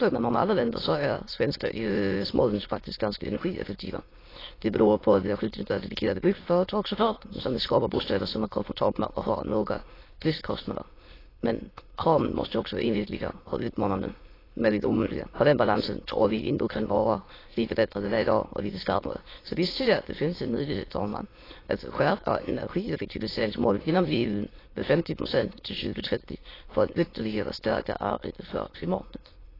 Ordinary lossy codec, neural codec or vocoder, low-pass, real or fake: AAC, 24 kbps; autoencoder, 22.05 kHz, a latent of 192 numbers a frame, VITS, trained on many speakers; 5.4 kHz; fake